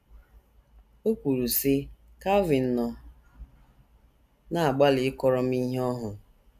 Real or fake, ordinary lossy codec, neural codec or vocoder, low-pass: real; none; none; 14.4 kHz